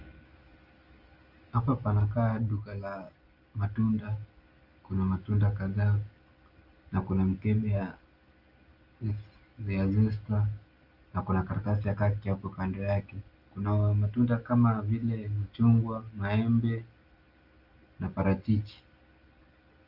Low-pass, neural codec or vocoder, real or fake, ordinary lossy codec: 5.4 kHz; none; real; Opus, 24 kbps